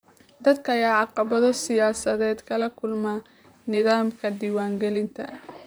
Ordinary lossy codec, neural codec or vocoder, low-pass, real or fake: none; vocoder, 44.1 kHz, 128 mel bands, Pupu-Vocoder; none; fake